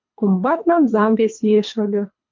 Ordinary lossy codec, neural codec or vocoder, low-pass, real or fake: MP3, 48 kbps; codec, 24 kHz, 3 kbps, HILCodec; 7.2 kHz; fake